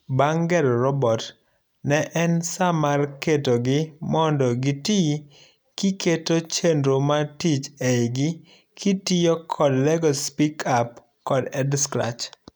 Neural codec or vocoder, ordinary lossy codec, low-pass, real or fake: none; none; none; real